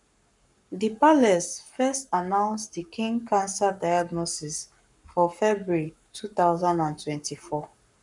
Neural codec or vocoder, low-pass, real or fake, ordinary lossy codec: codec, 44.1 kHz, 7.8 kbps, Pupu-Codec; 10.8 kHz; fake; none